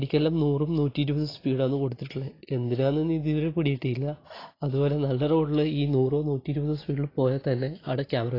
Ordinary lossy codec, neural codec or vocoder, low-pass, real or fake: AAC, 24 kbps; none; 5.4 kHz; real